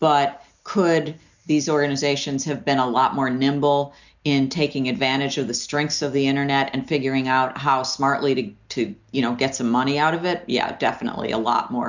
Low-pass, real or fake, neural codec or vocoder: 7.2 kHz; real; none